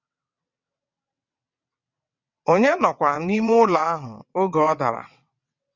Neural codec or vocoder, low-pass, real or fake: vocoder, 22.05 kHz, 80 mel bands, WaveNeXt; 7.2 kHz; fake